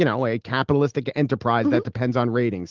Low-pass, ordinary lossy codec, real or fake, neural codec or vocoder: 7.2 kHz; Opus, 16 kbps; real; none